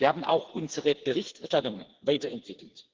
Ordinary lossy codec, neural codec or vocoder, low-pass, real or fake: Opus, 16 kbps; codec, 16 kHz, 4 kbps, FreqCodec, smaller model; 7.2 kHz; fake